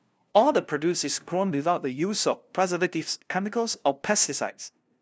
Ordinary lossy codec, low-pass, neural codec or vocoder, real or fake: none; none; codec, 16 kHz, 0.5 kbps, FunCodec, trained on LibriTTS, 25 frames a second; fake